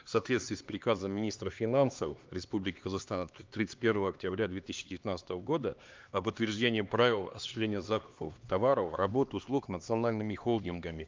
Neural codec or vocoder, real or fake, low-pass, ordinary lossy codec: codec, 16 kHz, 2 kbps, X-Codec, HuBERT features, trained on LibriSpeech; fake; 7.2 kHz; Opus, 24 kbps